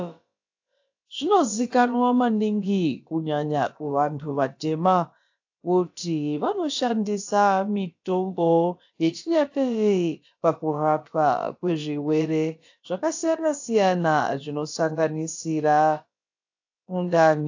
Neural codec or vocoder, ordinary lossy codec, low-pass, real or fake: codec, 16 kHz, about 1 kbps, DyCAST, with the encoder's durations; AAC, 48 kbps; 7.2 kHz; fake